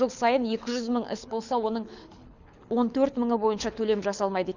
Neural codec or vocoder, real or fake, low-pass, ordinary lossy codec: codec, 24 kHz, 6 kbps, HILCodec; fake; 7.2 kHz; none